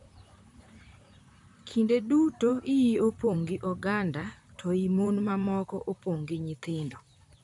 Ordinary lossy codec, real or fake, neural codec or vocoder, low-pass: none; fake; vocoder, 44.1 kHz, 128 mel bands, Pupu-Vocoder; 10.8 kHz